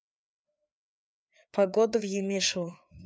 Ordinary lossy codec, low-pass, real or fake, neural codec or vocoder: none; none; fake; codec, 16 kHz, 4 kbps, FreqCodec, larger model